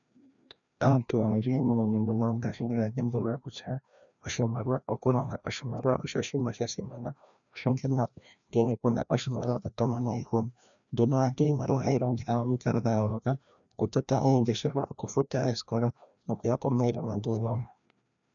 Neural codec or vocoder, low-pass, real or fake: codec, 16 kHz, 1 kbps, FreqCodec, larger model; 7.2 kHz; fake